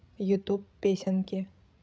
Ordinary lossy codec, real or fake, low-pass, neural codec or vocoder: none; fake; none; codec, 16 kHz, 16 kbps, FreqCodec, larger model